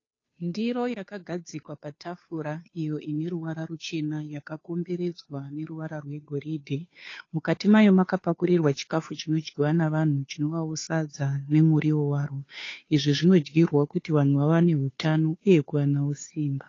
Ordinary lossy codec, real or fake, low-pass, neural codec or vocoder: AAC, 32 kbps; fake; 7.2 kHz; codec, 16 kHz, 2 kbps, FunCodec, trained on Chinese and English, 25 frames a second